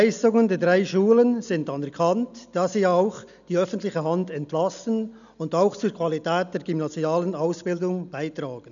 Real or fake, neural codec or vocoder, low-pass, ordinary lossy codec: real; none; 7.2 kHz; none